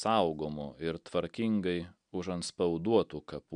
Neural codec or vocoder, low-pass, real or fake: none; 9.9 kHz; real